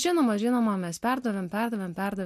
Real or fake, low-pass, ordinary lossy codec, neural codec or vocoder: real; 14.4 kHz; MP3, 64 kbps; none